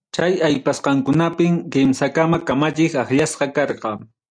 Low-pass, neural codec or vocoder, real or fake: 9.9 kHz; none; real